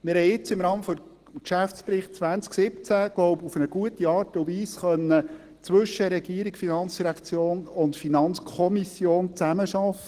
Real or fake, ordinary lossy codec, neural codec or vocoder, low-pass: real; Opus, 16 kbps; none; 14.4 kHz